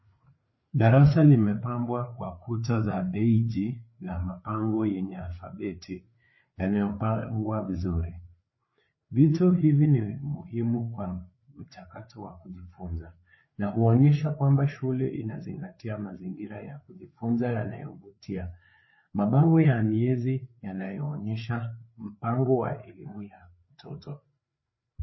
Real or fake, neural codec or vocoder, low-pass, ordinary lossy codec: fake; codec, 16 kHz, 4 kbps, FreqCodec, larger model; 7.2 kHz; MP3, 24 kbps